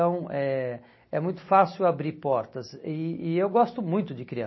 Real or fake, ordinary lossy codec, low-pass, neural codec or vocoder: real; MP3, 24 kbps; 7.2 kHz; none